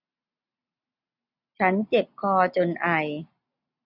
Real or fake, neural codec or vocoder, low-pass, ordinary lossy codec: real; none; 5.4 kHz; none